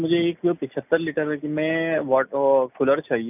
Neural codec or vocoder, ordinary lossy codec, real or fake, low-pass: none; none; real; 3.6 kHz